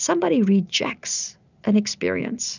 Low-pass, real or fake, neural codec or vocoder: 7.2 kHz; real; none